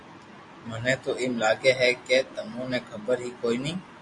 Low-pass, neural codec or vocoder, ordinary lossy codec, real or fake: 10.8 kHz; none; MP3, 48 kbps; real